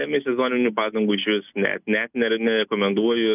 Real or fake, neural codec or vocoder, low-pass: real; none; 3.6 kHz